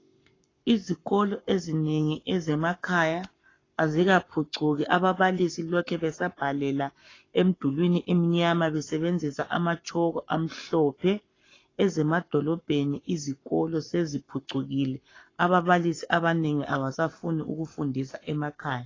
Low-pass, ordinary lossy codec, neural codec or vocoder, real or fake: 7.2 kHz; AAC, 32 kbps; codec, 44.1 kHz, 7.8 kbps, Pupu-Codec; fake